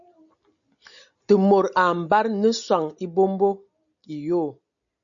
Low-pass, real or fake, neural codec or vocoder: 7.2 kHz; real; none